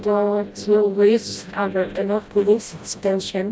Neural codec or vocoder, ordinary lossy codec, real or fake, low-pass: codec, 16 kHz, 0.5 kbps, FreqCodec, smaller model; none; fake; none